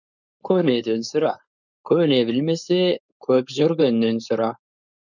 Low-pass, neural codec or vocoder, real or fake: 7.2 kHz; codec, 16 kHz, 4.8 kbps, FACodec; fake